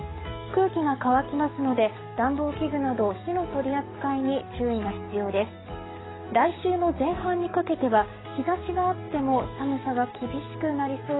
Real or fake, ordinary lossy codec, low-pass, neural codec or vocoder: fake; AAC, 16 kbps; 7.2 kHz; codec, 44.1 kHz, 7.8 kbps, DAC